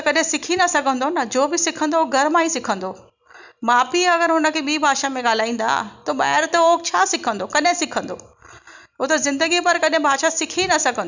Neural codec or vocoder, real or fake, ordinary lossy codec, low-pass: none; real; none; 7.2 kHz